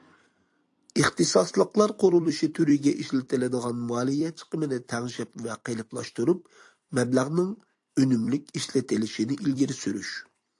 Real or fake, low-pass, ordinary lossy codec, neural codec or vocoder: fake; 10.8 kHz; AAC, 64 kbps; vocoder, 44.1 kHz, 128 mel bands every 256 samples, BigVGAN v2